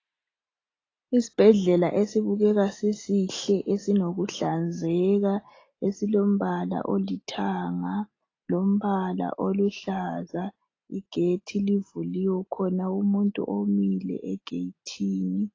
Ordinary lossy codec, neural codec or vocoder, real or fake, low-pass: AAC, 32 kbps; none; real; 7.2 kHz